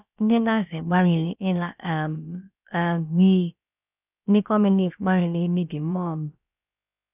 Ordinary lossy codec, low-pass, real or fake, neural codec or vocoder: none; 3.6 kHz; fake; codec, 16 kHz, about 1 kbps, DyCAST, with the encoder's durations